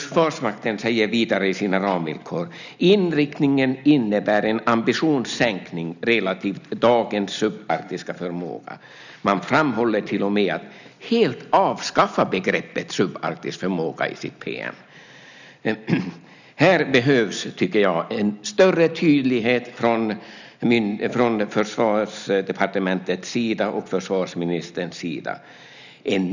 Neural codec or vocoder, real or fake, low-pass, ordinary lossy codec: none; real; 7.2 kHz; none